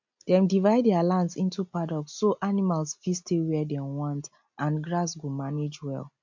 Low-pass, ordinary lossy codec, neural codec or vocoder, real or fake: 7.2 kHz; MP3, 48 kbps; none; real